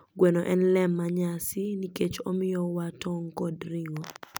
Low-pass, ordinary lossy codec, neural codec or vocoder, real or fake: none; none; none; real